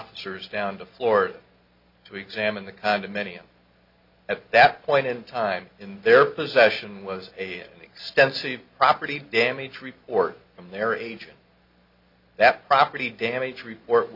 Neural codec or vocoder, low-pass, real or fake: none; 5.4 kHz; real